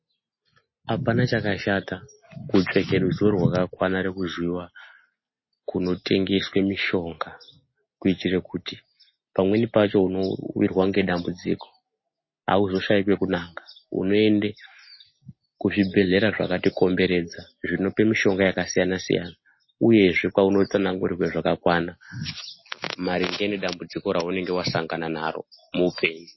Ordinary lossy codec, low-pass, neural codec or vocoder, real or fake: MP3, 24 kbps; 7.2 kHz; none; real